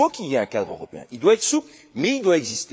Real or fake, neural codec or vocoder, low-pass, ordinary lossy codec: fake; codec, 16 kHz, 4 kbps, FreqCodec, larger model; none; none